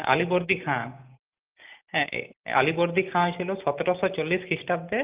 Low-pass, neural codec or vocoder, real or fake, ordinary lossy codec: 3.6 kHz; none; real; Opus, 24 kbps